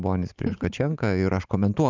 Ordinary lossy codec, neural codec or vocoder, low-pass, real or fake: Opus, 24 kbps; none; 7.2 kHz; real